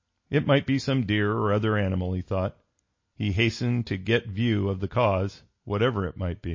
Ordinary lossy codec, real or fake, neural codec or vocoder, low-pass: MP3, 32 kbps; real; none; 7.2 kHz